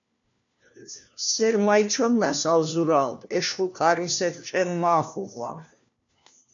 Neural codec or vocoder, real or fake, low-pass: codec, 16 kHz, 1 kbps, FunCodec, trained on LibriTTS, 50 frames a second; fake; 7.2 kHz